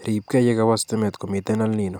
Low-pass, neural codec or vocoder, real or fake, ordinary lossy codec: none; none; real; none